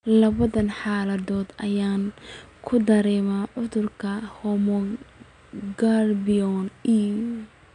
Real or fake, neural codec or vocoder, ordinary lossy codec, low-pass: real; none; none; 9.9 kHz